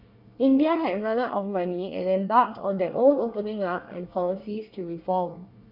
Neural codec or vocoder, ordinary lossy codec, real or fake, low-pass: codec, 24 kHz, 1 kbps, SNAC; none; fake; 5.4 kHz